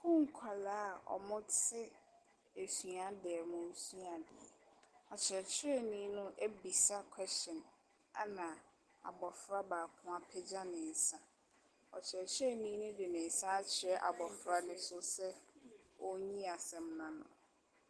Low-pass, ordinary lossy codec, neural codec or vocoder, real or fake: 10.8 kHz; Opus, 16 kbps; none; real